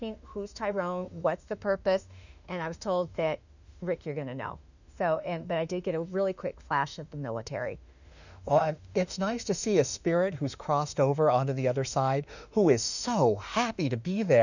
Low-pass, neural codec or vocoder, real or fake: 7.2 kHz; autoencoder, 48 kHz, 32 numbers a frame, DAC-VAE, trained on Japanese speech; fake